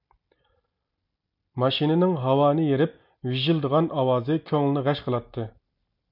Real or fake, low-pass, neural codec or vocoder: real; 5.4 kHz; none